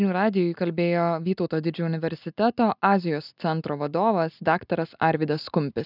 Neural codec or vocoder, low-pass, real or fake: none; 5.4 kHz; real